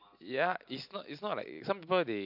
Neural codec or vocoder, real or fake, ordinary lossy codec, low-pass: none; real; AAC, 48 kbps; 5.4 kHz